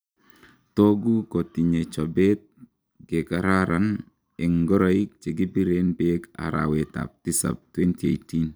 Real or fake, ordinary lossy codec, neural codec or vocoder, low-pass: real; none; none; none